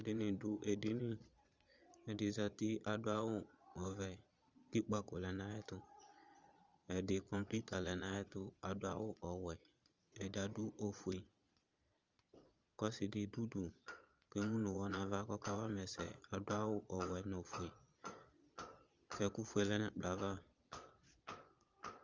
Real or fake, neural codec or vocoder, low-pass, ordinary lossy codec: fake; vocoder, 22.05 kHz, 80 mel bands, Vocos; 7.2 kHz; Opus, 32 kbps